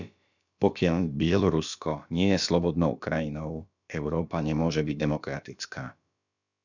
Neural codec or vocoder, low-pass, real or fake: codec, 16 kHz, about 1 kbps, DyCAST, with the encoder's durations; 7.2 kHz; fake